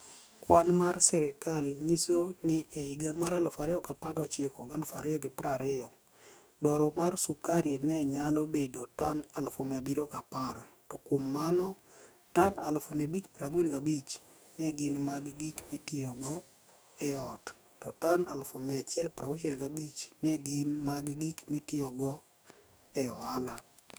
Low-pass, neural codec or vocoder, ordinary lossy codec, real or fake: none; codec, 44.1 kHz, 2.6 kbps, DAC; none; fake